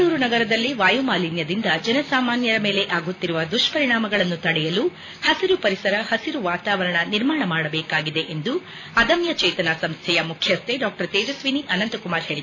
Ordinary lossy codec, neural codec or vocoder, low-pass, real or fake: none; none; 7.2 kHz; real